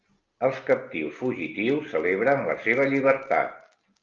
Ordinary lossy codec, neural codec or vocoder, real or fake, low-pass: Opus, 32 kbps; none; real; 7.2 kHz